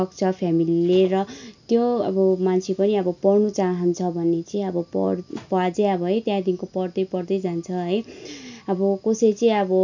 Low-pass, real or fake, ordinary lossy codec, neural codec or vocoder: 7.2 kHz; real; none; none